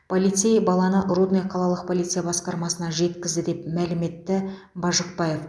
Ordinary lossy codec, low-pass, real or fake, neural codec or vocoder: none; none; real; none